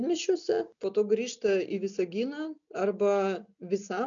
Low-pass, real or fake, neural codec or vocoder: 7.2 kHz; real; none